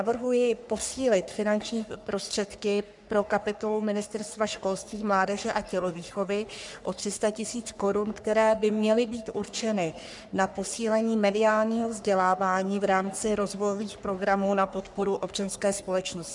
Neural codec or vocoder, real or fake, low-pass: codec, 44.1 kHz, 3.4 kbps, Pupu-Codec; fake; 10.8 kHz